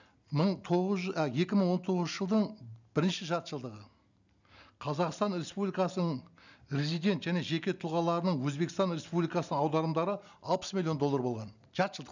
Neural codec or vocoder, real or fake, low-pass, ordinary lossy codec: none; real; 7.2 kHz; none